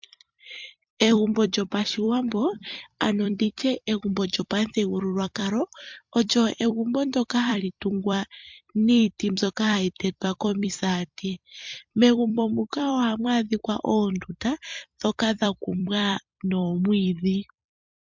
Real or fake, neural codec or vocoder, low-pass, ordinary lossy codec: fake; vocoder, 44.1 kHz, 128 mel bands every 256 samples, BigVGAN v2; 7.2 kHz; MP3, 64 kbps